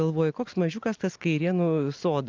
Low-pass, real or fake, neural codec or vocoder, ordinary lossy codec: 7.2 kHz; real; none; Opus, 32 kbps